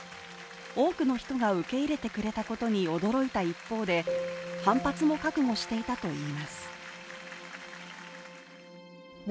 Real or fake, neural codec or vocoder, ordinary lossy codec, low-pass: real; none; none; none